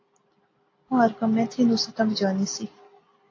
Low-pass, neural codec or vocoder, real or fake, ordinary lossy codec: 7.2 kHz; none; real; AAC, 32 kbps